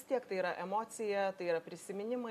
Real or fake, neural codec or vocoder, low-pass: real; none; 14.4 kHz